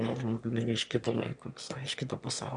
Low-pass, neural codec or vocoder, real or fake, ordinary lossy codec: 9.9 kHz; autoencoder, 22.05 kHz, a latent of 192 numbers a frame, VITS, trained on one speaker; fake; MP3, 96 kbps